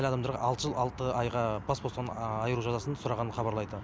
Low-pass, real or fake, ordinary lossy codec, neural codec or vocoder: none; real; none; none